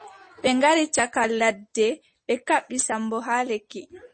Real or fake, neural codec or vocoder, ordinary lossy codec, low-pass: real; none; MP3, 32 kbps; 9.9 kHz